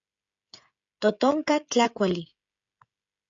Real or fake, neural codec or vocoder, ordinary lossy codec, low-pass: fake; codec, 16 kHz, 16 kbps, FreqCodec, smaller model; AAC, 64 kbps; 7.2 kHz